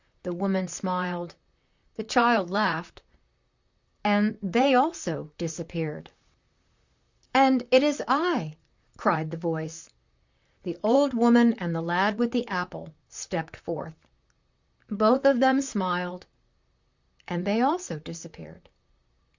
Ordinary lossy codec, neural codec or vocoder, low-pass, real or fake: Opus, 64 kbps; vocoder, 44.1 kHz, 128 mel bands, Pupu-Vocoder; 7.2 kHz; fake